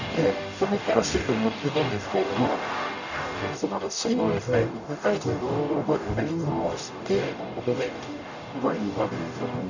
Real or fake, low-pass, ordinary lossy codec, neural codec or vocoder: fake; 7.2 kHz; none; codec, 44.1 kHz, 0.9 kbps, DAC